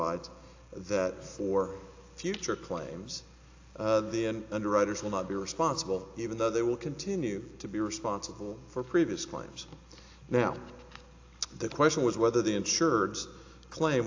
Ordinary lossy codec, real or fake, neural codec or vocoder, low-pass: AAC, 48 kbps; real; none; 7.2 kHz